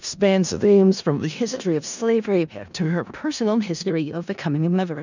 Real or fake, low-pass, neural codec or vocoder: fake; 7.2 kHz; codec, 16 kHz in and 24 kHz out, 0.4 kbps, LongCat-Audio-Codec, four codebook decoder